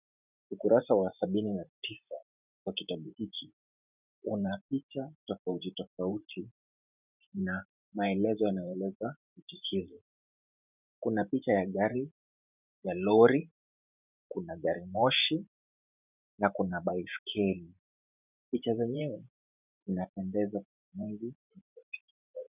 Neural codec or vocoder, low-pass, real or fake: vocoder, 44.1 kHz, 128 mel bands every 512 samples, BigVGAN v2; 3.6 kHz; fake